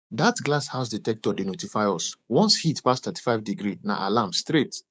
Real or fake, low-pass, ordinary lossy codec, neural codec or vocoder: fake; none; none; codec, 16 kHz, 6 kbps, DAC